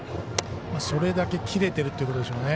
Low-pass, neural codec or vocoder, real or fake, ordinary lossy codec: none; none; real; none